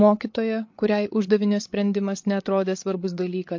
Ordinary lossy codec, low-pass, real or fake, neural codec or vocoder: MP3, 48 kbps; 7.2 kHz; fake; codec, 16 kHz, 8 kbps, FreqCodec, larger model